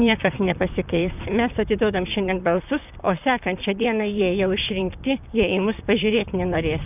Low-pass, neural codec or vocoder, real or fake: 3.6 kHz; vocoder, 44.1 kHz, 128 mel bands, Pupu-Vocoder; fake